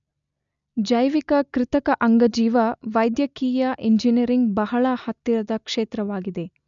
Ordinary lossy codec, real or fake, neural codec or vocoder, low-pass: none; real; none; 7.2 kHz